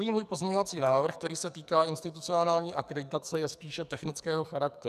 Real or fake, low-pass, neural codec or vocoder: fake; 14.4 kHz; codec, 44.1 kHz, 2.6 kbps, SNAC